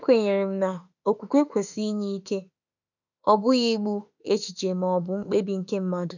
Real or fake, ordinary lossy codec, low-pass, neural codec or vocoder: fake; none; 7.2 kHz; autoencoder, 48 kHz, 32 numbers a frame, DAC-VAE, trained on Japanese speech